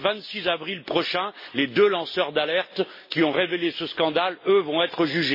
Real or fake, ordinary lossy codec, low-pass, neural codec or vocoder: real; MP3, 24 kbps; 5.4 kHz; none